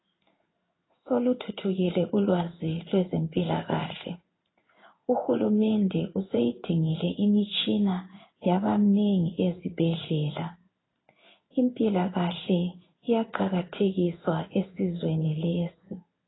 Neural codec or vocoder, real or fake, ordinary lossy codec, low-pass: codec, 16 kHz in and 24 kHz out, 1 kbps, XY-Tokenizer; fake; AAC, 16 kbps; 7.2 kHz